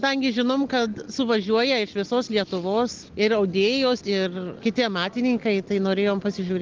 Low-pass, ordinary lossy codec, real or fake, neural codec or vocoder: 7.2 kHz; Opus, 16 kbps; real; none